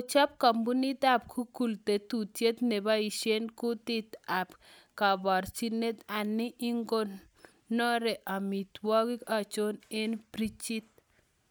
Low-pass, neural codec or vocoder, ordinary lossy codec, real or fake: none; none; none; real